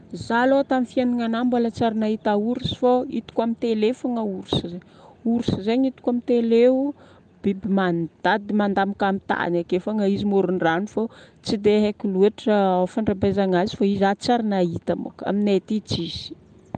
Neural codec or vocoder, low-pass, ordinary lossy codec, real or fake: none; 9.9 kHz; Opus, 32 kbps; real